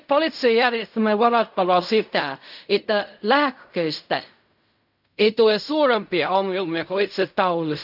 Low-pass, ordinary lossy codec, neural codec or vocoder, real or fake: 5.4 kHz; none; codec, 16 kHz in and 24 kHz out, 0.4 kbps, LongCat-Audio-Codec, fine tuned four codebook decoder; fake